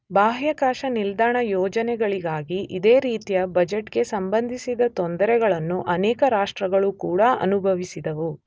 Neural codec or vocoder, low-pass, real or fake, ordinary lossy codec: none; none; real; none